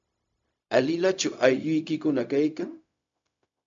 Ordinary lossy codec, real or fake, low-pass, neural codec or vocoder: MP3, 64 kbps; fake; 7.2 kHz; codec, 16 kHz, 0.4 kbps, LongCat-Audio-Codec